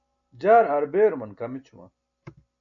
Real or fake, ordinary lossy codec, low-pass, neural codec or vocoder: real; MP3, 96 kbps; 7.2 kHz; none